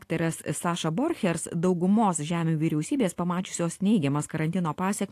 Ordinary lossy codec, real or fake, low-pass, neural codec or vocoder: AAC, 64 kbps; real; 14.4 kHz; none